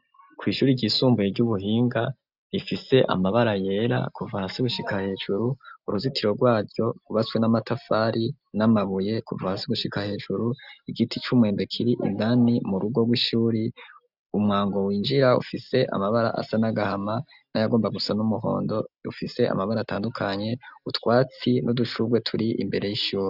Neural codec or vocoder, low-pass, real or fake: none; 5.4 kHz; real